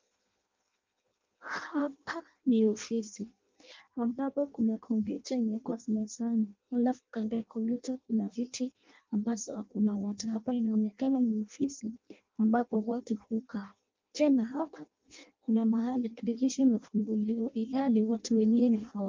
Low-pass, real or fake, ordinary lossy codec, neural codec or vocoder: 7.2 kHz; fake; Opus, 24 kbps; codec, 16 kHz in and 24 kHz out, 0.6 kbps, FireRedTTS-2 codec